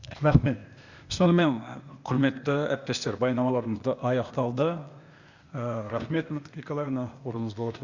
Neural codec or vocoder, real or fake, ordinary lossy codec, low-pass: codec, 16 kHz, 0.8 kbps, ZipCodec; fake; Opus, 64 kbps; 7.2 kHz